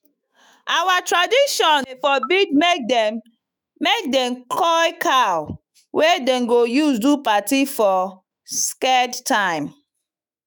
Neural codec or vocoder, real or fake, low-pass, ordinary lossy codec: autoencoder, 48 kHz, 128 numbers a frame, DAC-VAE, trained on Japanese speech; fake; none; none